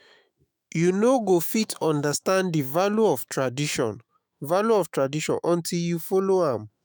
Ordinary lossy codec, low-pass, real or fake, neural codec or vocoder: none; none; fake; autoencoder, 48 kHz, 128 numbers a frame, DAC-VAE, trained on Japanese speech